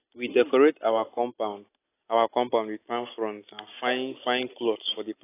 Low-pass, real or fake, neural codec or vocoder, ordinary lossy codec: 3.6 kHz; real; none; AAC, 24 kbps